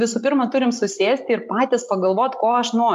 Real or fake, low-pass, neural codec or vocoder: real; 14.4 kHz; none